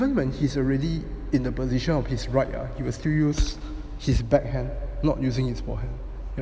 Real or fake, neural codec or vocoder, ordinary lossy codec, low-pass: real; none; none; none